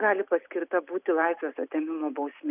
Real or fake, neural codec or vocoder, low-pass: real; none; 3.6 kHz